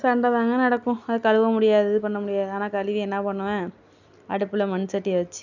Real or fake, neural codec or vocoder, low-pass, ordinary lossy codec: real; none; 7.2 kHz; none